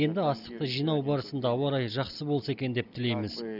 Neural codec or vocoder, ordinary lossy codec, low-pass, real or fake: none; none; 5.4 kHz; real